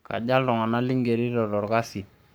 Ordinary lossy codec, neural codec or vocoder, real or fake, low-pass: none; codec, 44.1 kHz, 7.8 kbps, Pupu-Codec; fake; none